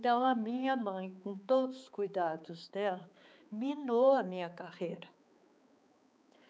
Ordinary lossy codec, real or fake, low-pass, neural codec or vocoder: none; fake; none; codec, 16 kHz, 4 kbps, X-Codec, HuBERT features, trained on balanced general audio